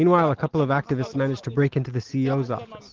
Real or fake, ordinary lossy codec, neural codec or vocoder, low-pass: real; Opus, 16 kbps; none; 7.2 kHz